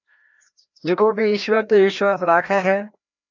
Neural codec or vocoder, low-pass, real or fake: codec, 16 kHz, 1 kbps, FreqCodec, larger model; 7.2 kHz; fake